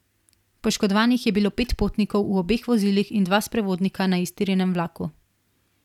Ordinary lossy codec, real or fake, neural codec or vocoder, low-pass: none; real; none; 19.8 kHz